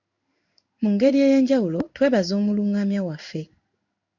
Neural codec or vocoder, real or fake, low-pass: codec, 16 kHz in and 24 kHz out, 1 kbps, XY-Tokenizer; fake; 7.2 kHz